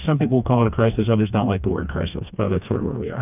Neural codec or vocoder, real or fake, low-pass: codec, 16 kHz, 2 kbps, FreqCodec, smaller model; fake; 3.6 kHz